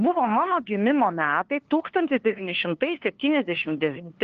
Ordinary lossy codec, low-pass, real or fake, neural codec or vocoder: Opus, 16 kbps; 7.2 kHz; fake; codec, 16 kHz, 2 kbps, FunCodec, trained on LibriTTS, 25 frames a second